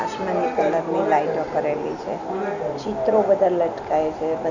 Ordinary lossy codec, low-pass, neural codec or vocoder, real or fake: none; 7.2 kHz; none; real